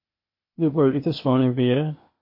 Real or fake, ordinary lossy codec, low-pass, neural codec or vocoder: fake; MP3, 32 kbps; 5.4 kHz; codec, 16 kHz, 0.8 kbps, ZipCodec